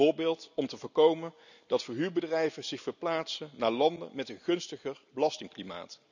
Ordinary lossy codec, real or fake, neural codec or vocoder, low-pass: none; real; none; 7.2 kHz